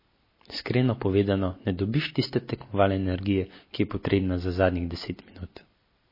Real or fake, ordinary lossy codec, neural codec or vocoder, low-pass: real; MP3, 24 kbps; none; 5.4 kHz